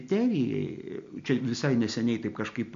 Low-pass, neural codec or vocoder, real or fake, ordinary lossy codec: 7.2 kHz; none; real; MP3, 48 kbps